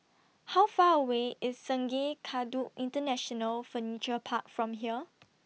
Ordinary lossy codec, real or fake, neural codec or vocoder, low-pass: none; real; none; none